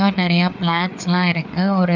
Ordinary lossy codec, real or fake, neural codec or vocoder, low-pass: none; fake; codec, 16 kHz, 4 kbps, FreqCodec, larger model; 7.2 kHz